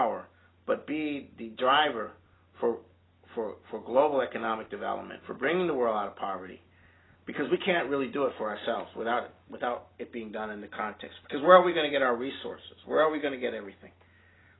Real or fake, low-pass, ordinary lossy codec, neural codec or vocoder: real; 7.2 kHz; AAC, 16 kbps; none